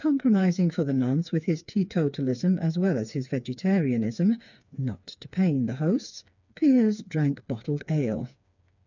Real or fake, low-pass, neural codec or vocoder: fake; 7.2 kHz; codec, 16 kHz, 4 kbps, FreqCodec, smaller model